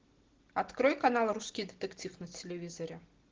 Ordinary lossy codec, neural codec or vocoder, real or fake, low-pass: Opus, 16 kbps; none; real; 7.2 kHz